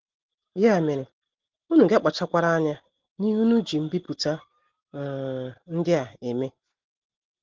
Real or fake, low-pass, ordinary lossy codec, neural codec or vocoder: real; 7.2 kHz; Opus, 16 kbps; none